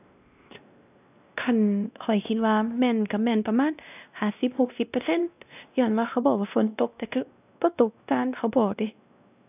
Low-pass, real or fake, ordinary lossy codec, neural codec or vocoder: 3.6 kHz; fake; none; codec, 16 kHz, 1 kbps, X-Codec, WavLM features, trained on Multilingual LibriSpeech